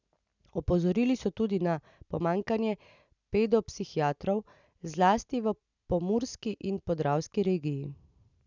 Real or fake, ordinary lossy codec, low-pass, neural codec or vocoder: real; none; 7.2 kHz; none